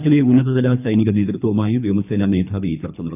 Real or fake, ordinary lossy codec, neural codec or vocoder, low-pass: fake; none; codec, 24 kHz, 3 kbps, HILCodec; 3.6 kHz